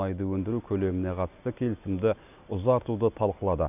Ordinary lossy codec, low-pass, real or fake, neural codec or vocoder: none; 3.6 kHz; real; none